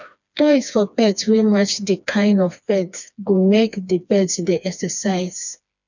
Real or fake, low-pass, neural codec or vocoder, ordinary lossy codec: fake; 7.2 kHz; codec, 16 kHz, 2 kbps, FreqCodec, smaller model; none